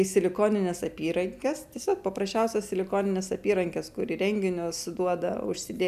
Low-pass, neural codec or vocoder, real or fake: 14.4 kHz; none; real